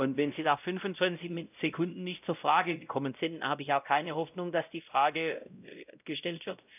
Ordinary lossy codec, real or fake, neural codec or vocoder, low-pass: none; fake; codec, 16 kHz, 0.5 kbps, X-Codec, WavLM features, trained on Multilingual LibriSpeech; 3.6 kHz